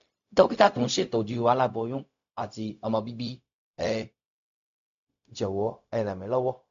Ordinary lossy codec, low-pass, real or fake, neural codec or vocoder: AAC, 64 kbps; 7.2 kHz; fake; codec, 16 kHz, 0.4 kbps, LongCat-Audio-Codec